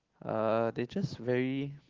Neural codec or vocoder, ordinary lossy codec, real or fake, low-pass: none; Opus, 16 kbps; real; 7.2 kHz